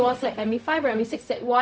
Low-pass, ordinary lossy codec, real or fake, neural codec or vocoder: none; none; fake; codec, 16 kHz, 0.4 kbps, LongCat-Audio-Codec